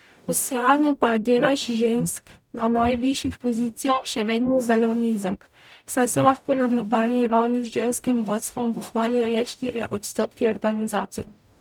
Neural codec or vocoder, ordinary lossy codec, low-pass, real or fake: codec, 44.1 kHz, 0.9 kbps, DAC; none; 19.8 kHz; fake